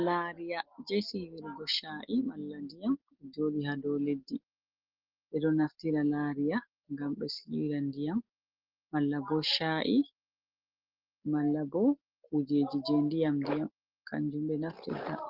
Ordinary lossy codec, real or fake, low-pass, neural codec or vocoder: Opus, 16 kbps; real; 5.4 kHz; none